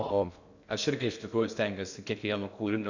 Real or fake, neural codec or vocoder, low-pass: fake; codec, 16 kHz in and 24 kHz out, 0.6 kbps, FocalCodec, streaming, 2048 codes; 7.2 kHz